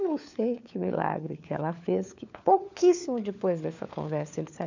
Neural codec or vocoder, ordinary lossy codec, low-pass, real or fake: codec, 16 kHz, 4 kbps, FunCodec, trained on LibriTTS, 50 frames a second; none; 7.2 kHz; fake